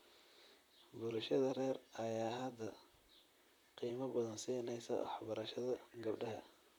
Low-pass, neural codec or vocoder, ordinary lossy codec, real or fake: none; vocoder, 44.1 kHz, 128 mel bands, Pupu-Vocoder; none; fake